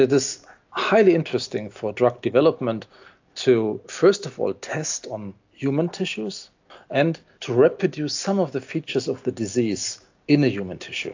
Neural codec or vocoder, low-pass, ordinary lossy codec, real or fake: none; 7.2 kHz; AAC, 48 kbps; real